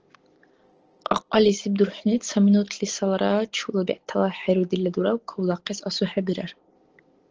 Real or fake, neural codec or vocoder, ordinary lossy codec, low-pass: real; none; Opus, 16 kbps; 7.2 kHz